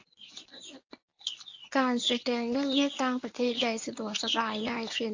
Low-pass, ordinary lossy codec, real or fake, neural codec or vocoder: 7.2 kHz; AAC, 48 kbps; fake; codec, 24 kHz, 0.9 kbps, WavTokenizer, medium speech release version 1